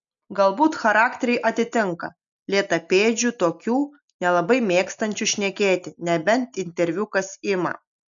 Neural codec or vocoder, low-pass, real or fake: none; 7.2 kHz; real